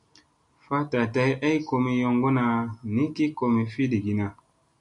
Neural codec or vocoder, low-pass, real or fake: none; 10.8 kHz; real